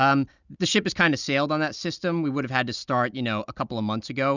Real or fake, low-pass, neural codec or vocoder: real; 7.2 kHz; none